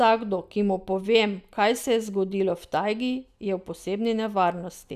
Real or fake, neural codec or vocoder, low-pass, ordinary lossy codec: real; none; 14.4 kHz; none